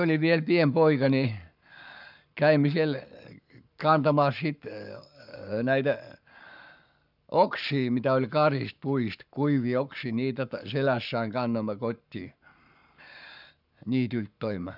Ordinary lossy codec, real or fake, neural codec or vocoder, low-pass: none; fake; codec, 44.1 kHz, 7.8 kbps, Pupu-Codec; 5.4 kHz